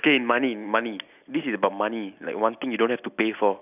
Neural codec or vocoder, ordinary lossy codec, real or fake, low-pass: none; none; real; 3.6 kHz